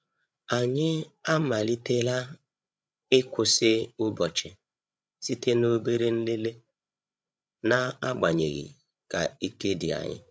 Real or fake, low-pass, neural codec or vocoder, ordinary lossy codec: fake; none; codec, 16 kHz, 8 kbps, FreqCodec, larger model; none